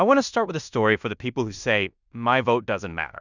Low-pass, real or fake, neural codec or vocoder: 7.2 kHz; fake; codec, 16 kHz in and 24 kHz out, 0.9 kbps, LongCat-Audio-Codec, fine tuned four codebook decoder